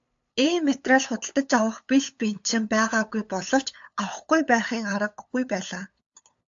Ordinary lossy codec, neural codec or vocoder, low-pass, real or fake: AAC, 64 kbps; codec, 16 kHz, 8 kbps, FunCodec, trained on LibriTTS, 25 frames a second; 7.2 kHz; fake